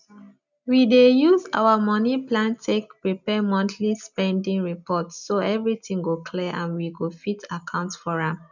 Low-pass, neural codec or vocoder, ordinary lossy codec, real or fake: 7.2 kHz; none; none; real